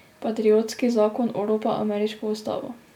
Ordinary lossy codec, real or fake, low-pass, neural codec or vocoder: none; real; 19.8 kHz; none